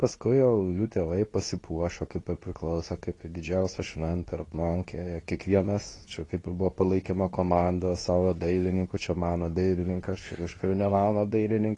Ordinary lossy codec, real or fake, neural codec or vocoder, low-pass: AAC, 32 kbps; fake; codec, 24 kHz, 0.9 kbps, WavTokenizer, medium speech release version 2; 10.8 kHz